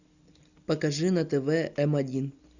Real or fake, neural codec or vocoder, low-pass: real; none; 7.2 kHz